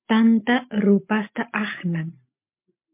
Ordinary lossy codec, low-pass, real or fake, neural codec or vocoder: MP3, 32 kbps; 3.6 kHz; real; none